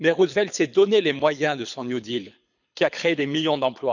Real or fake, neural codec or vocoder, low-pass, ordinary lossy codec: fake; codec, 24 kHz, 6 kbps, HILCodec; 7.2 kHz; none